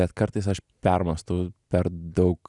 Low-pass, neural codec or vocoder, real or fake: 10.8 kHz; none; real